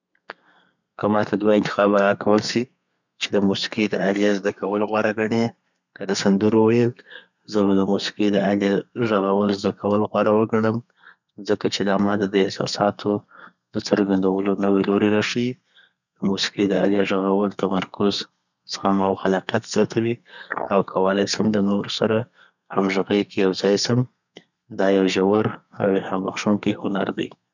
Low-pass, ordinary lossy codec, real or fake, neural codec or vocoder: 7.2 kHz; none; fake; codec, 32 kHz, 1.9 kbps, SNAC